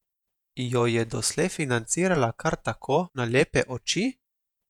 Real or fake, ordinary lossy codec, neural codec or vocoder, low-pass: fake; none; vocoder, 48 kHz, 128 mel bands, Vocos; 19.8 kHz